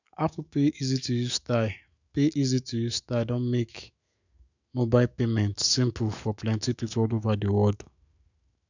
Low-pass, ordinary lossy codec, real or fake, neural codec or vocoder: 7.2 kHz; none; fake; autoencoder, 48 kHz, 128 numbers a frame, DAC-VAE, trained on Japanese speech